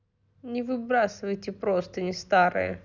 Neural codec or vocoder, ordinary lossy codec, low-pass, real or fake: none; none; 7.2 kHz; real